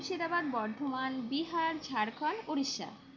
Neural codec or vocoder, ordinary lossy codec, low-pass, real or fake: none; none; 7.2 kHz; real